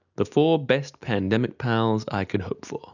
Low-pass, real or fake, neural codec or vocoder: 7.2 kHz; real; none